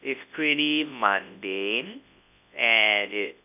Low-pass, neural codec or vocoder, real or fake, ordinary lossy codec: 3.6 kHz; codec, 24 kHz, 0.9 kbps, WavTokenizer, large speech release; fake; none